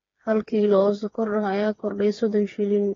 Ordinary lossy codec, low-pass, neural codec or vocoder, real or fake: AAC, 32 kbps; 7.2 kHz; codec, 16 kHz, 4 kbps, FreqCodec, smaller model; fake